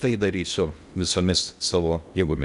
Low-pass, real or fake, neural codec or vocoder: 10.8 kHz; fake; codec, 16 kHz in and 24 kHz out, 0.8 kbps, FocalCodec, streaming, 65536 codes